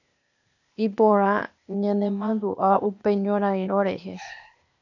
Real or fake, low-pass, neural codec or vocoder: fake; 7.2 kHz; codec, 16 kHz, 0.8 kbps, ZipCodec